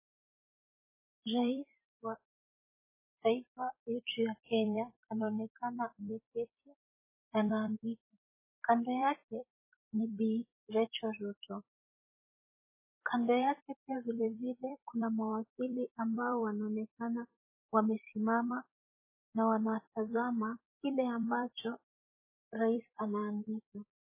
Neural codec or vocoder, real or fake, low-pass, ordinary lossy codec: vocoder, 44.1 kHz, 128 mel bands every 256 samples, BigVGAN v2; fake; 3.6 kHz; MP3, 16 kbps